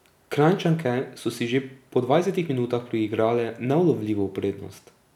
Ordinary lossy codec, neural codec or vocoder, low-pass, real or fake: none; none; 19.8 kHz; real